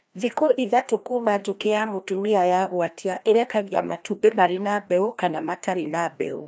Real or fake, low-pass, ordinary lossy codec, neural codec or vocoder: fake; none; none; codec, 16 kHz, 1 kbps, FreqCodec, larger model